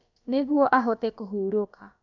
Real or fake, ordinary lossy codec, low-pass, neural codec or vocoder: fake; none; 7.2 kHz; codec, 16 kHz, about 1 kbps, DyCAST, with the encoder's durations